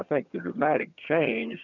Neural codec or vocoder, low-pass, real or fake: vocoder, 22.05 kHz, 80 mel bands, HiFi-GAN; 7.2 kHz; fake